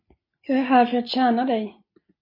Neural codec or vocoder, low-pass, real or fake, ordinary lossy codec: none; 5.4 kHz; real; MP3, 24 kbps